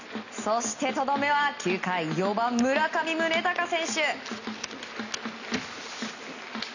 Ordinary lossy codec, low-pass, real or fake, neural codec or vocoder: none; 7.2 kHz; real; none